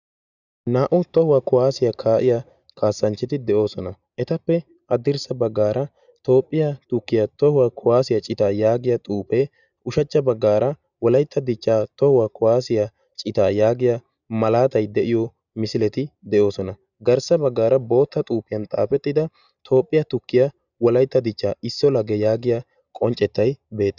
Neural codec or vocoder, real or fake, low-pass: none; real; 7.2 kHz